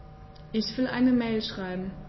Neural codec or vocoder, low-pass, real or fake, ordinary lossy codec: none; 7.2 kHz; real; MP3, 24 kbps